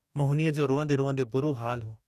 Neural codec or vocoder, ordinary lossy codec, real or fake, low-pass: codec, 44.1 kHz, 2.6 kbps, DAC; none; fake; 14.4 kHz